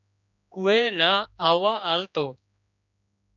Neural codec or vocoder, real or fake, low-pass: codec, 16 kHz, 2 kbps, X-Codec, HuBERT features, trained on general audio; fake; 7.2 kHz